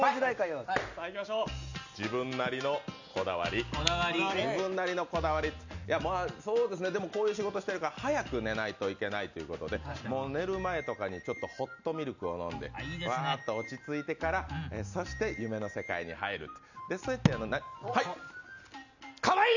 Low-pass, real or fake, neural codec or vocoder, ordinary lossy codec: 7.2 kHz; real; none; none